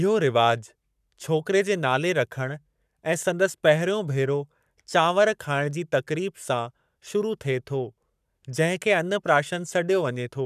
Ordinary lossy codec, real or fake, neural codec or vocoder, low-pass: none; fake; codec, 44.1 kHz, 7.8 kbps, Pupu-Codec; 14.4 kHz